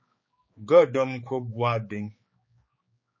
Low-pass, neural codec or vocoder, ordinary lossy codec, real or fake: 7.2 kHz; codec, 16 kHz, 4 kbps, X-Codec, HuBERT features, trained on general audio; MP3, 32 kbps; fake